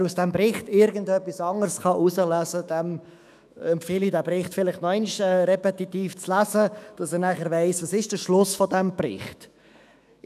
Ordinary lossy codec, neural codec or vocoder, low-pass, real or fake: none; autoencoder, 48 kHz, 128 numbers a frame, DAC-VAE, trained on Japanese speech; 14.4 kHz; fake